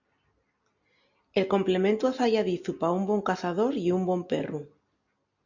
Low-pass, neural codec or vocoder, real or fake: 7.2 kHz; none; real